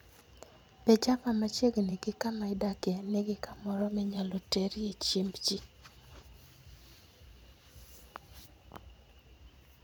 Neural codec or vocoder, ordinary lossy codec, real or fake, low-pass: vocoder, 44.1 kHz, 128 mel bands every 512 samples, BigVGAN v2; none; fake; none